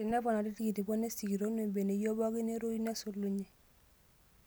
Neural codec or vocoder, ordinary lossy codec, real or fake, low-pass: none; none; real; none